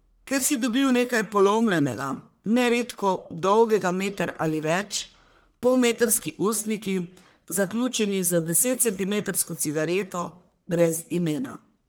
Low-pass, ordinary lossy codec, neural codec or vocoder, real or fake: none; none; codec, 44.1 kHz, 1.7 kbps, Pupu-Codec; fake